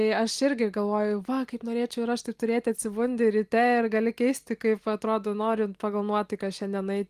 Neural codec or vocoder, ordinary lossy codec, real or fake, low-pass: none; Opus, 24 kbps; real; 14.4 kHz